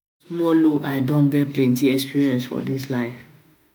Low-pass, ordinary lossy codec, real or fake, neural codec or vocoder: none; none; fake; autoencoder, 48 kHz, 32 numbers a frame, DAC-VAE, trained on Japanese speech